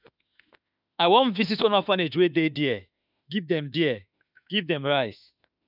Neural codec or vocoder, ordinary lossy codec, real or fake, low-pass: autoencoder, 48 kHz, 32 numbers a frame, DAC-VAE, trained on Japanese speech; none; fake; 5.4 kHz